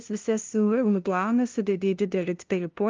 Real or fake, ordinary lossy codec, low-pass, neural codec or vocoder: fake; Opus, 32 kbps; 7.2 kHz; codec, 16 kHz, 0.5 kbps, FunCodec, trained on LibriTTS, 25 frames a second